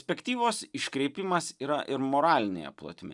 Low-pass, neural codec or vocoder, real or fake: 10.8 kHz; none; real